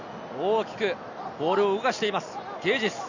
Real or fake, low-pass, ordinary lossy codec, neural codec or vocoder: real; 7.2 kHz; none; none